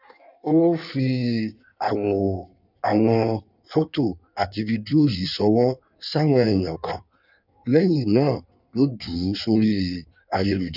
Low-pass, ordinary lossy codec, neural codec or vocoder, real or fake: 5.4 kHz; none; codec, 16 kHz in and 24 kHz out, 1.1 kbps, FireRedTTS-2 codec; fake